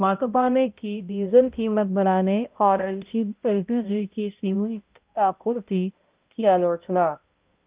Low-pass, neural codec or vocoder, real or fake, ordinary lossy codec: 3.6 kHz; codec, 16 kHz, 0.5 kbps, X-Codec, HuBERT features, trained on balanced general audio; fake; Opus, 24 kbps